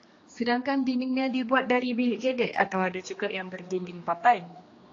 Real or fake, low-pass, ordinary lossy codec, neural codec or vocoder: fake; 7.2 kHz; AAC, 32 kbps; codec, 16 kHz, 2 kbps, X-Codec, HuBERT features, trained on general audio